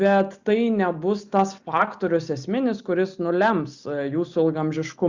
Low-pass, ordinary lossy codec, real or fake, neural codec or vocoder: 7.2 kHz; Opus, 64 kbps; real; none